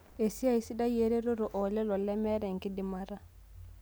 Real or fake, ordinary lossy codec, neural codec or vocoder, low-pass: real; none; none; none